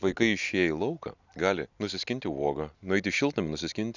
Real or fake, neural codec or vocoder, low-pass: real; none; 7.2 kHz